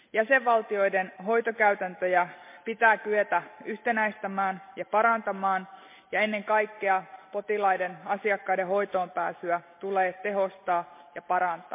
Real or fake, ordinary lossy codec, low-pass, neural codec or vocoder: real; MP3, 24 kbps; 3.6 kHz; none